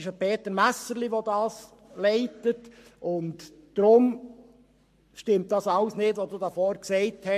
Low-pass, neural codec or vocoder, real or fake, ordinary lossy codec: 14.4 kHz; codec, 44.1 kHz, 7.8 kbps, Pupu-Codec; fake; MP3, 64 kbps